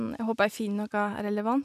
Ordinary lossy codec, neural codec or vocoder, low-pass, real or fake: none; none; 14.4 kHz; real